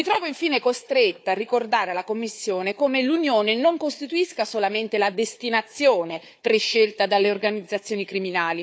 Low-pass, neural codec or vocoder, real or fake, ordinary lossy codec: none; codec, 16 kHz, 4 kbps, FunCodec, trained on Chinese and English, 50 frames a second; fake; none